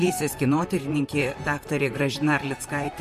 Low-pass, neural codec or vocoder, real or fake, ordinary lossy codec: 14.4 kHz; vocoder, 44.1 kHz, 128 mel bands, Pupu-Vocoder; fake; MP3, 64 kbps